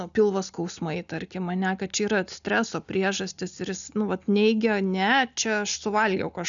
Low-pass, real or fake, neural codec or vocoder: 7.2 kHz; real; none